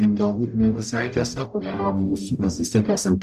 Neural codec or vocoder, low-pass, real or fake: codec, 44.1 kHz, 0.9 kbps, DAC; 14.4 kHz; fake